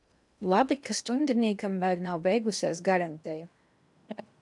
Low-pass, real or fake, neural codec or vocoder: 10.8 kHz; fake; codec, 16 kHz in and 24 kHz out, 0.8 kbps, FocalCodec, streaming, 65536 codes